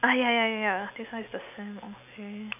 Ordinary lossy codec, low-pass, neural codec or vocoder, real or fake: none; 3.6 kHz; none; real